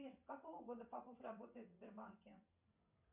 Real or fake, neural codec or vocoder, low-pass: fake; vocoder, 22.05 kHz, 80 mel bands, WaveNeXt; 3.6 kHz